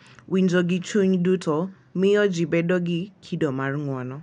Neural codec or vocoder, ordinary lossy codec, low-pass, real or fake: none; none; 9.9 kHz; real